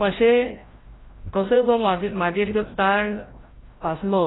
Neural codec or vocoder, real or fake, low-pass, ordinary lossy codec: codec, 16 kHz, 0.5 kbps, FreqCodec, larger model; fake; 7.2 kHz; AAC, 16 kbps